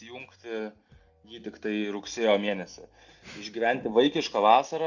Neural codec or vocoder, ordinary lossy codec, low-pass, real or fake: none; Opus, 64 kbps; 7.2 kHz; real